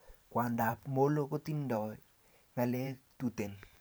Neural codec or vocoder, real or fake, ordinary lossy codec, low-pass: vocoder, 44.1 kHz, 128 mel bands every 512 samples, BigVGAN v2; fake; none; none